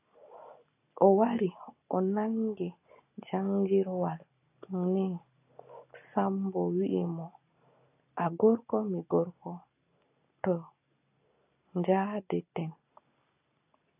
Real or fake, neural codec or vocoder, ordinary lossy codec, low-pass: real; none; AAC, 24 kbps; 3.6 kHz